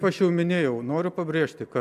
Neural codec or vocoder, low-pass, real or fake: none; 14.4 kHz; real